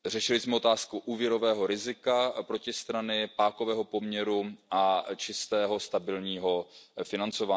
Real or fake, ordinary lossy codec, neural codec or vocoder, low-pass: real; none; none; none